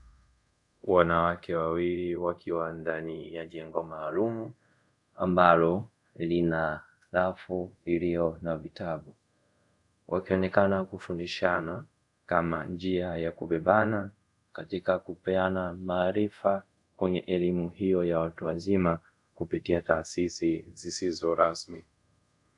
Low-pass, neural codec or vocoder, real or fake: 10.8 kHz; codec, 24 kHz, 0.5 kbps, DualCodec; fake